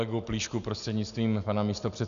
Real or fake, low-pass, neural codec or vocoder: real; 7.2 kHz; none